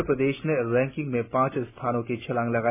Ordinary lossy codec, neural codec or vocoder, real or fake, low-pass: none; none; real; 3.6 kHz